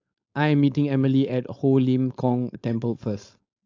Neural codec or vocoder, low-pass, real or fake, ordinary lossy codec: codec, 16 kHz, 4.8 kbps, FACodec; 7.2 kHz; fake; AAC, 48 kbps